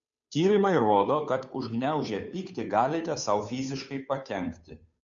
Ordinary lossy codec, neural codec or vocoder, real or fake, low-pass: MP3, 64 kbps; codec, 16 kHz, 2 kbps, FunCodec, trained on Chinese and English, 25 frames a second; fake; 7.2 kHz